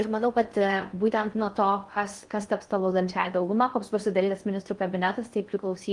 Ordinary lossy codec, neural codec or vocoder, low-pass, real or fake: Opus, 24 kbps; codec, 16 kHz in and 24 kHz out, 0.6 kbps, FocalCodec, streaming, 4096 codes; 10.8 kHz; fake